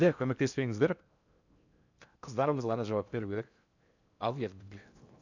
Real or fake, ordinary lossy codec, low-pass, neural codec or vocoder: fake; none; 7.2 kHz; codec, 16 kHz in and 24 kHz out, 0.6 kbps, FocalCodec, streaming, 4096 codes